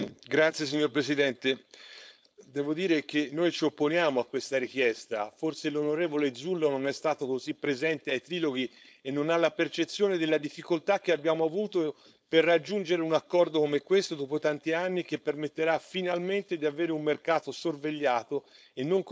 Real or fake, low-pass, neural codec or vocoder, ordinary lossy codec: fake; none; codec, 16 kHz, 4.8 kbps, FACodec; none